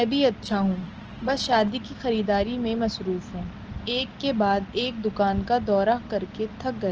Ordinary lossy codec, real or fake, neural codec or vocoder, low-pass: Opus, 16 kbps; real; none; 7.2 kHz